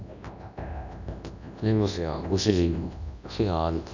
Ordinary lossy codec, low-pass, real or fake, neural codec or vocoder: none; 7.2 kHz; fake; codec, 24 kHz, 0.9 kbps, WavTokenizer, large speech release